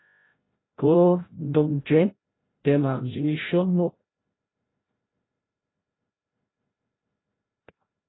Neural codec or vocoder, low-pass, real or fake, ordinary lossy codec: codec, 16 kHz, 0.5 kbps, FreqCodec, larger model; 7.2 kHz; fake; AAC, 16 kbps